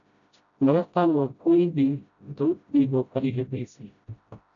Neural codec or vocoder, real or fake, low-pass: codec, 16 kHz, 0.5 kbps, FreqCodec, smaller model; fake; 7.2 kHz